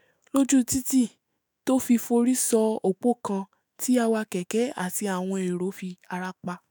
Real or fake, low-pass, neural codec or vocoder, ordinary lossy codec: fake; none; autoencoder, 48 kHz, 128 numbers a frame, DAC-VAE, trained on Japanese speech; none